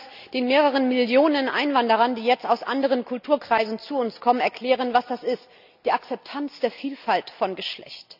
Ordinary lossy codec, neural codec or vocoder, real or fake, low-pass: none; none; real; 5.4 kHz